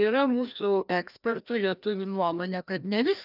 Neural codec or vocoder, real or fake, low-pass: codec, 16 kHz, 1 kbps, FreqCodec, larger model; fake; 5.4 kHz